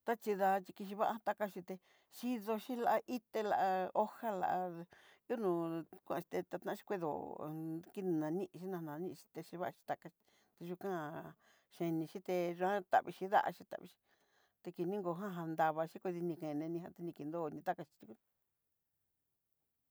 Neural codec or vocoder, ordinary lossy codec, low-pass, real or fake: none; none; none; real